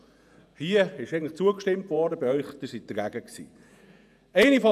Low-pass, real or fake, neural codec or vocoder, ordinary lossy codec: 14.4 kHz; fake; vocoder, 48 kHz, 128 mel bands, Vocos; none